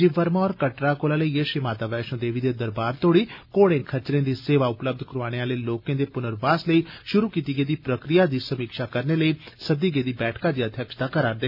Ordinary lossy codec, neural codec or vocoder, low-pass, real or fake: none; none; 5.4 kHz; real